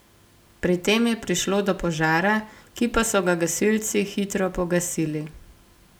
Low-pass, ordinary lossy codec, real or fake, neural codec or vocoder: none; none; real; none